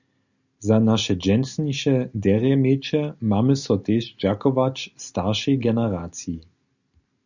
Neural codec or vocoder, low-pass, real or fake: none; 7.2 kHz; real